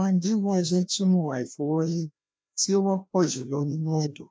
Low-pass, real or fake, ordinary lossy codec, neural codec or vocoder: none; fake; none; codec, 16 kHz, 1 kbps, FreqCodec, larger model